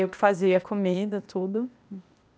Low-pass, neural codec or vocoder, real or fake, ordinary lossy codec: none; codec, 16 kHz, 0.8 kbps, ZipCodec; fake; none